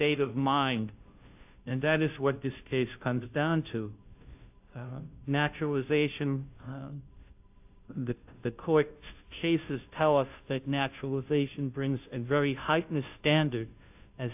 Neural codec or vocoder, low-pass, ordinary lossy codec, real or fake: codec, 16 kHz, 0.5 kbps, FunCodec, trained on Chinese and English, 25 frames a second; 3.6 kHz; AAC, 32 kbps; fake